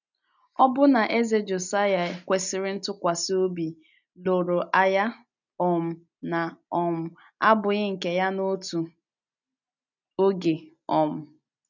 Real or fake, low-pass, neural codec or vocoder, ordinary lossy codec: real; 7.2 kHz; none; none